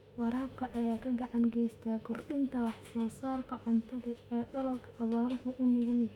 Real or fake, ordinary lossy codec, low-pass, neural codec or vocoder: fake; none; 19.8 kHz; autoencoder, 48 kHz, 32 numbers a frame, DAC-VAE, trained on Japanese speech